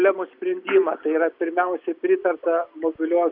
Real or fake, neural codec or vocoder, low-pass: real; none; 5.4 kHz